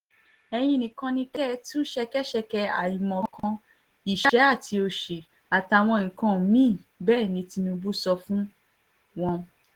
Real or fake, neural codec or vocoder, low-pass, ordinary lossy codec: real; none; 19.8 kHz; Opus, 16 kbps